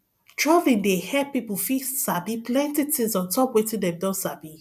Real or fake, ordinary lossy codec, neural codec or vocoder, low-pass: real; none; none; 14.4 kHz